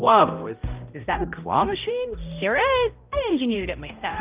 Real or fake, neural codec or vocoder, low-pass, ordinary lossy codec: fake; codec, 16 kHz, 0.5 kbps, X-Codec, HuBERT features, trained on balanced general audio; 3.6 kHz; Opus, 24 kbps